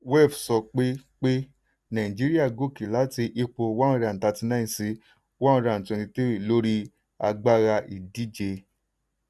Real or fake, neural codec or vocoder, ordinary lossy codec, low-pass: real; none; none; none